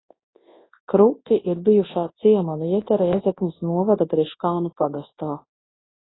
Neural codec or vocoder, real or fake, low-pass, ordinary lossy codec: codec, 24 kHz, 0.9 kbps, WavTokenizer, large speech release; fake; 7.2 kHz; AAC, 16 kbps